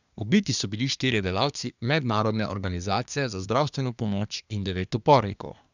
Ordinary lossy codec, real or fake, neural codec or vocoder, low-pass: none; fake; codec, 24 kHz, 1 kbps, SNAC; 7.2 kHz